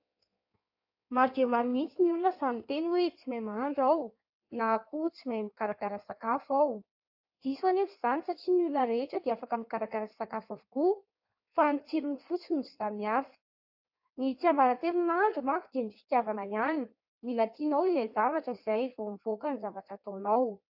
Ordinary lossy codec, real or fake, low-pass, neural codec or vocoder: AAC, 48 kbps; fake; 5.4 kHz; codec, 16 kHz in and 24 kHz out, 1.1 kbps, FireRedTTS-2 codec